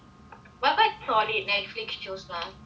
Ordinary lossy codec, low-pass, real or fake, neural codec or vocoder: none; none; real; none